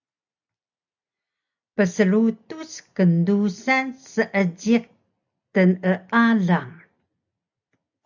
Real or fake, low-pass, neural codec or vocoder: real; 7.2 kHz; none